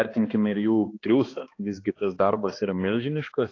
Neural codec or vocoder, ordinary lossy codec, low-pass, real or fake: codec, 16 kHz, 2 kbps, X-Codec, HuBERT features, trained on balanced general audio; AAC, 32 kbps; 7.2 kHz; fake